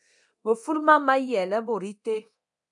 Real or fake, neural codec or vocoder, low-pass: fake; codec, 24 kHz, 0.9 kbps, DualCodec; 10.8 kHz